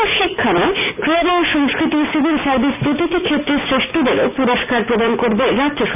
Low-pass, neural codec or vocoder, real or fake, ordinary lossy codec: 3.6 kHz; none; real; none